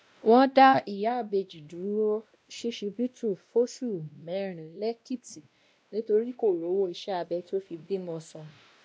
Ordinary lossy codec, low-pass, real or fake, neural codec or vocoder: none; none; fake; codec, 16 kHz, 1 kbps, X-Codec, WavLM features, trained on Multilingual LibriSpeech